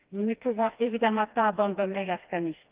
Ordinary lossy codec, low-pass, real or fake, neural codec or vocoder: Opus, 32 kbps; 3.6 kHz; fake; codec, 16 kHz, 2 kbps, FreqCodec, smaller model